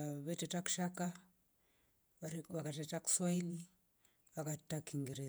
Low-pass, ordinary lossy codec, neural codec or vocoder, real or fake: none; none; vocoder, 48 kHz, 128 mel bands, Vocos; fake